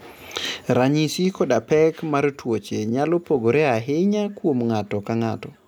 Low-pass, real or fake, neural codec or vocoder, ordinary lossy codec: 19.8 kHz; real; none; none